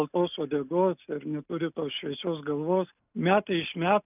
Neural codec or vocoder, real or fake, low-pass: none; real; 3.6 kHz